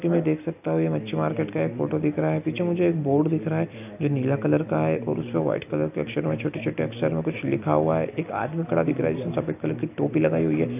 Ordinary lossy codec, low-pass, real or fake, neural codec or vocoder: AAC, 32 kbps; 3.6 kHz; real; none